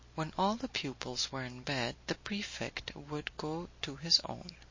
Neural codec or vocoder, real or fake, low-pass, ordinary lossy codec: none; real; 7.2 kHz; MP3, 32 kbps